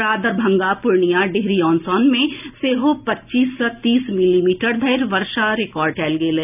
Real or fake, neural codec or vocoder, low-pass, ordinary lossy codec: real; none; 3.6 kHz; none